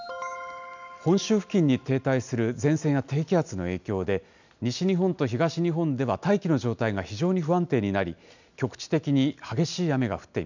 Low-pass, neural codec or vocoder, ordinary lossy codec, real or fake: 7.2 kHz; none; none; real